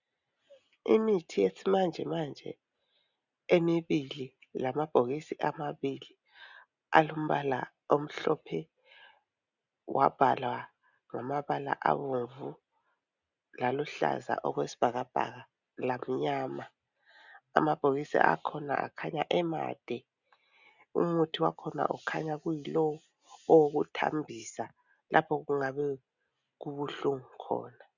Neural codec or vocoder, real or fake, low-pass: none; real; 7.2 kHz